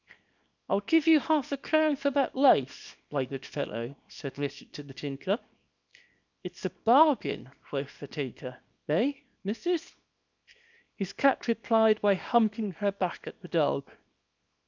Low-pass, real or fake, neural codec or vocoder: 7.2 kHz; fake; codec, 24 kHz, 0.9 kbps, WavTokenizer, small release